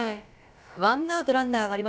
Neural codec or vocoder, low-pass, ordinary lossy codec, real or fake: codec, 16 kHz, about 1 kbps, DyCAST, with the encoder's durations; none; none; fake